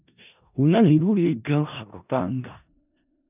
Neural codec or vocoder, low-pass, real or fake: codec, 16 kHz in and 24 kHz out, 0.4 kbps, LongCat-Audio-Codec, four codebook decoder; 3.6 kHz; fake